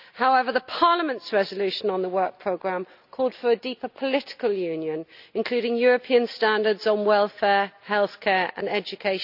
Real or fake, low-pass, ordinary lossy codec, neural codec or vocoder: real; 5.4 kHz; none; none